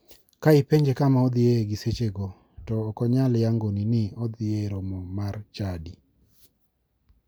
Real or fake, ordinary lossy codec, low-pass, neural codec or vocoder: real; none; none; none